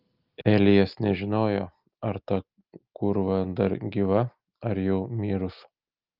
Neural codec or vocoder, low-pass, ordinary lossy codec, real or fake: none; 5.4 kHz; Opus, 24 kbps; real